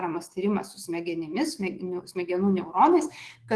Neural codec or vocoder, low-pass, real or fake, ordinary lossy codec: autoencoder, 48 kHz, 128 numbers a frame, DAC-VAE, trained on Japanese speech; 10.8 kHz; fake; Opus, 16 kbps